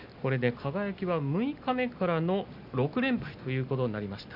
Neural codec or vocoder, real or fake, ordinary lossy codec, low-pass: none; real; none; 5.4 kHz